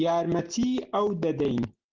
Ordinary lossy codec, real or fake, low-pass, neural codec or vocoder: Opus, 16 kbps; real; 7.2 kHz; none